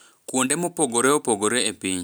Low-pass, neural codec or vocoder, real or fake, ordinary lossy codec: none; none; real; none